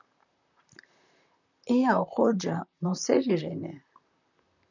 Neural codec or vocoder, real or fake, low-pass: vocoder, 44.1 kHz, 128 mel bands, Pupu-Vocoder; fake; 7.2 kHz